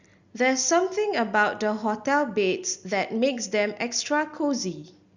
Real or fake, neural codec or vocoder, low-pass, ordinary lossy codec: real; none; 7.2 kHz; Opus, 64 kbps